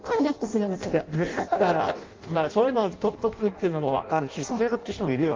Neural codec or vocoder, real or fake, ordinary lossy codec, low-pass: codec, 16 kHz in and 24 kHz out, 0.6 kbps, FireRedTTS-2 codec; fake; Opus, 32 kbps; 7.2 kHz